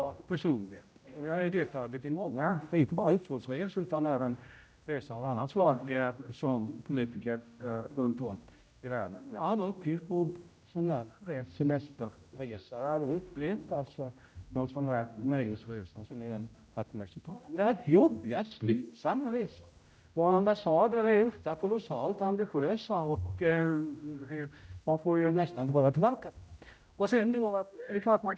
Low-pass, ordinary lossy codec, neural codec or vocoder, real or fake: none; none; codec, 16 kHz, 0.5 kbps, X-Codec, HuBERT features, trained on general audio; fake